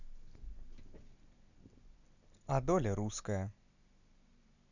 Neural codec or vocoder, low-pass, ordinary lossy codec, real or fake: none; 7.2 kHz; none; real